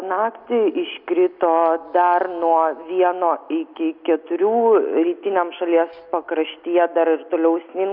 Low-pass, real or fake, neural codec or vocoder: 5.4 kHz; real; none